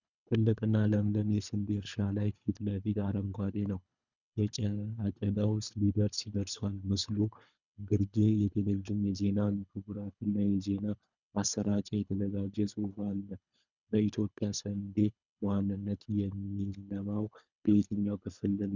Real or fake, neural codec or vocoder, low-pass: fake; codec, 24 kHz, 3 kbps, HILCodec; 7.2 kHz